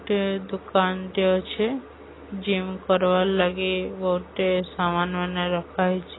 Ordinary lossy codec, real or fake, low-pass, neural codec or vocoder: AAC, 16 kbps; real; 7.2 kHz; none